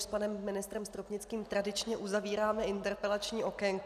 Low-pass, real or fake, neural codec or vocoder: 14.4 kHz; real; none